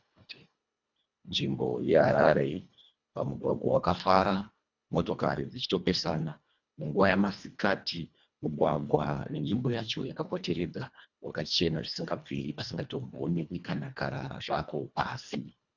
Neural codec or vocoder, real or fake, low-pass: codec, 24 kHz, 1.5 kbps, HILCodec; fake; 7.2 kHz